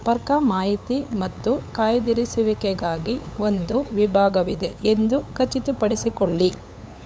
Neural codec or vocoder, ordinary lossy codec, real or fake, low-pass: codec, 16 kHz, 8 kbps, FunCodec, trained on LibriTTS, 25 frames a second; none; fake; none